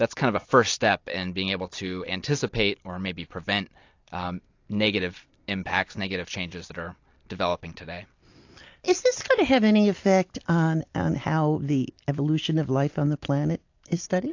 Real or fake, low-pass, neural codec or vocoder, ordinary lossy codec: real; 7.2 kHz; none; AAC, 48 kbps